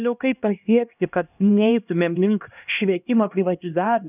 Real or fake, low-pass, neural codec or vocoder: fake; 3.6 kHz; codec, 16 kHz, 1 kbps, X-Codec, HuBERT features, trained on LibriSpeech